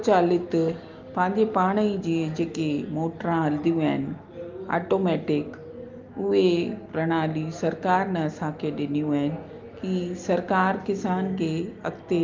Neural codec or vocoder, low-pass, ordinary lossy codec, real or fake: none; 7.2 kHz; Opus, 32 kbps; real